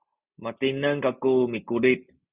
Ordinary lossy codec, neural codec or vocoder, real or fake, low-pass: Opus, 64 kbps; none; real; 3.6 kHz